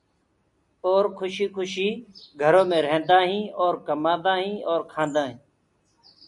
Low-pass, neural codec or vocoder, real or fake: 10.8 kHz; none; real